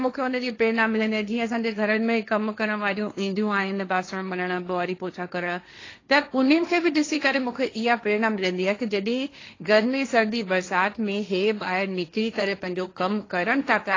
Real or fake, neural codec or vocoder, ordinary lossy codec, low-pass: fake; codec, 16 kHz, 1.1 kbps, Voila-Tokenizer; AAC, 32 kbps; 7.2 kHz